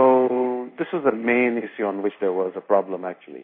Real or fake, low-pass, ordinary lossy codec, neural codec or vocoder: fake; 5.4 kHz; MP3, 24 kbps; codec, 16 kHz in and 24 kHz out, 1 kbps, XY-Tokenizer